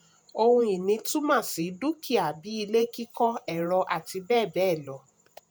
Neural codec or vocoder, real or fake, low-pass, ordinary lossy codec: vocoder, 48 kHz, 128 mel bands, Vocos; fake; none; none